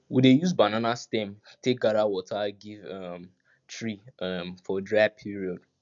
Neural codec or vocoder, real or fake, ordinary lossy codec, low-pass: none; real; none; 7.2 kHz